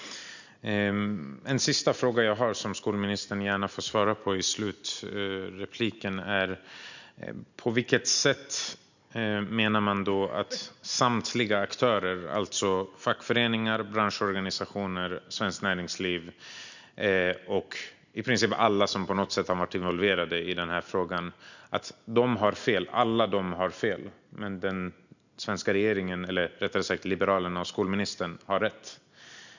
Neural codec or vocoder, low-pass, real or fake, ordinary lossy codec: none; 7.2 kHz; real; none